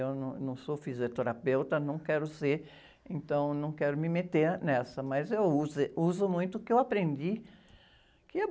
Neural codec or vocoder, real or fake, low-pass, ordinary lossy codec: none; real; none; none